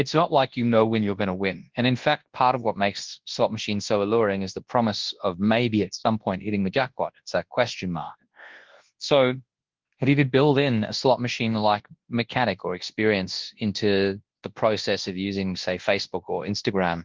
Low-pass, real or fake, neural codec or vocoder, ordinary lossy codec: 7.2 kHz; fake; codec, 24 kHz, 0.9 kbps, WavTokenizer, large speech release; Opus, 16 kbps